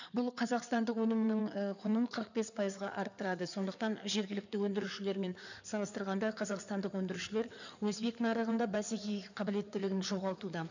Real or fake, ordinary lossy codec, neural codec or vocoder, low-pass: fake; none; codec, 16 kHz in and 24 kHz out, 2.2 kbps, FireRedTTS-2 codec; 7.2 kHz